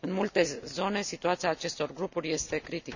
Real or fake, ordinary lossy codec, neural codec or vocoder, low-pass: real; none; none; 7.2 kHz